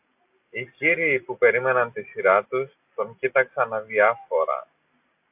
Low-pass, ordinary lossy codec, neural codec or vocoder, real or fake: 3.6 kHz; AAC, 32 kbps; none; real